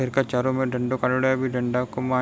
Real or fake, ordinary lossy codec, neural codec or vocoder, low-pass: real; none; none; none